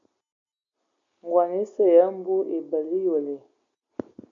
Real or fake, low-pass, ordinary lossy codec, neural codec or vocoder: real; 7.2 kHz; AAC, 48 kbps; none